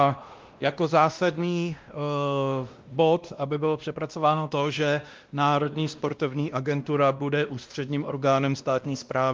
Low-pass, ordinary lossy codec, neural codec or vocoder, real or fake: 7.2 kHz; Opus, 24 kbps; codec, 16 kHz, 1 kbps, X-Codec, WavLM features, trained on Multilingual LibriSpeech; fake